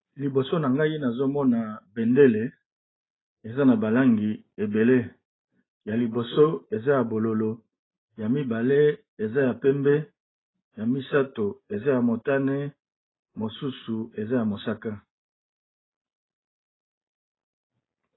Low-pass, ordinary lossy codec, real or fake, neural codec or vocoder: 7.2 kHz; AAC, 16 kbps; real; none